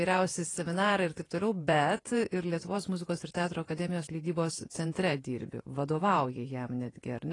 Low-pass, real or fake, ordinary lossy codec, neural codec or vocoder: 10.8 kHz; fake; AAC, 32 kbps; vocoder, 48 kHz, 128 mel bands, Vocos